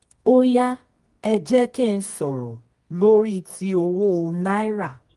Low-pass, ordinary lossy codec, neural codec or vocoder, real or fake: 10.8 kHz; Opus, 24 kbps; codec, 24 kHz, 0.9 kbps, WavTokenizer, medium music audio release; fake